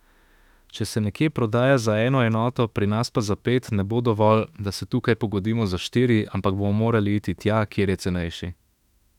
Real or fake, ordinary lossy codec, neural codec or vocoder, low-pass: fake; none; autoencoder, 48 kHz, 32 numbers a frame, DAC-VAE, trained on Japanese speech; 19.8 kHz